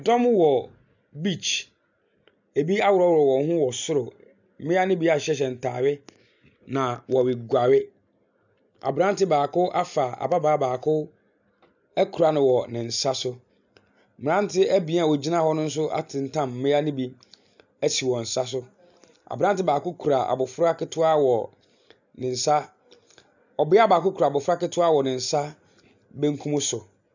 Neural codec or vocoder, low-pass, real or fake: none; 7.2 kHz; real